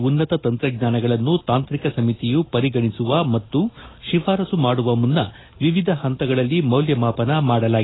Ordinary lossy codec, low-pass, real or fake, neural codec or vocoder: AAC, 16 kbps; 7.2 kHz; real; none